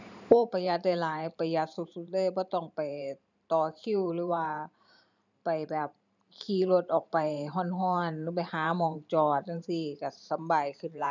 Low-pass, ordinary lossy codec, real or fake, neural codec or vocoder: 7.2 kHz; none; fake; vocoder, 44.1 kHz, 128 mel bands every 512 samples, BigVGAN v2